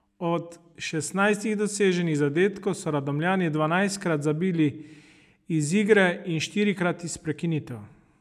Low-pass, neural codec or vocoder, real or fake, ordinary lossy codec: 14.4 kHz; none; real; none